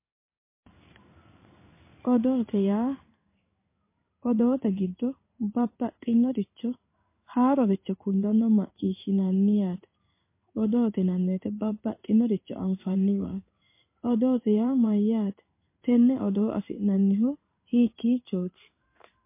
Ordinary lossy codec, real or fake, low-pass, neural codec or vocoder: MP3, 24 kbps; fake; 3.6 kHz; codec, 16 kHz in and 24 kHz out, 1 kbps, XY-Tokenizer